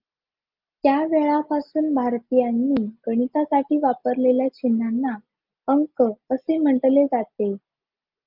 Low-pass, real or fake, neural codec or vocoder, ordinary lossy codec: 5.4 kHz; real; none; Opus, 24 kbps